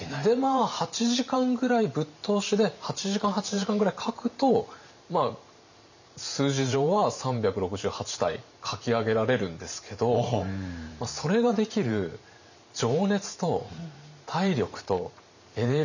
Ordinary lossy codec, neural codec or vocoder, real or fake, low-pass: none; vocoder, 44.1 kHz, 128 mel bands every 512 samples, BigVGAN v2; fake; 7.2 kHz